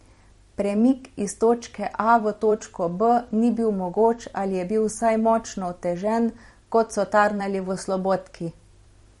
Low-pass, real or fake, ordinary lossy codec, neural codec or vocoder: 19.8 kHz; fake; MP3, 48 kbps; vocoder, 44.1 kHz, 128 mel bands every 256 samples, BigVGAN v2